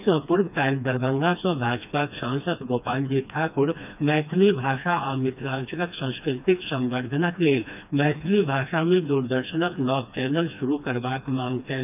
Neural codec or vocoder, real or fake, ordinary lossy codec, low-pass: codec, 16 kHz, 2 kbps, FreqCodec, smaller model; fake; none; 3.6 kHz